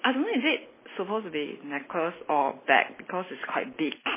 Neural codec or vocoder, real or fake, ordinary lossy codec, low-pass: none; real; MP3, 16 kbps; 3.6 kHz